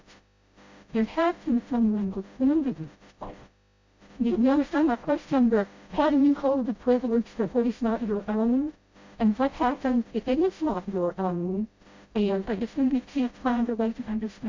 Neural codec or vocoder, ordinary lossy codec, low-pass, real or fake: codec, 16 kHz, 0.5 kbps, FreqCodec, smaller model; MP3, 64 kbps; 7.2 kHz; fake